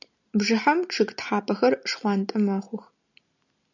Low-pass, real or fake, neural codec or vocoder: 7.2 kHz; real; none